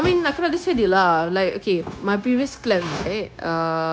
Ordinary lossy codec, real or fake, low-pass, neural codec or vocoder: none; fake; none; codec, 16 kHz, 0.9 kbps, LongCat-Audio-Codec